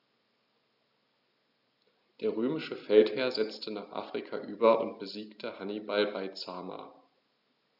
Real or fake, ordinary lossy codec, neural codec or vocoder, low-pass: real; none; none; 5.4 kHz